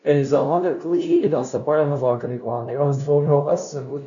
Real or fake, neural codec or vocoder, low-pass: fake; codec, 16 kHz, 0.5 kbps, FunCodec, trained on LibriTTS, 25 frames a second; 7.2 kHz